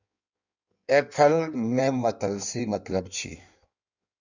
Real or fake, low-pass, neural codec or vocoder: fake; 7.2 kHz; codec, 16 kHz in and 24 kHz out, 1.1 kbps, FireRedTTS-2 codec